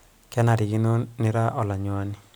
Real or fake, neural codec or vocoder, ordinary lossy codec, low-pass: real; none; none; none